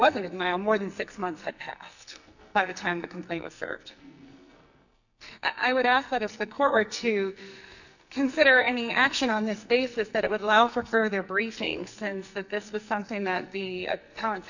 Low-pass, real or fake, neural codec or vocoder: 7.2 kHz; fake; codec, 44.1 kHz, 2.6 kbps, SNAC